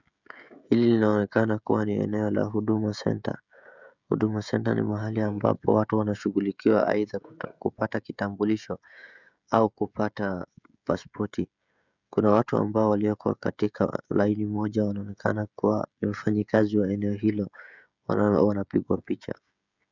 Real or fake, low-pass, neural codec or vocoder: fake; 7.2 kHz; codec, 16 kHz, 16 kbps, FreqCodec, smaller model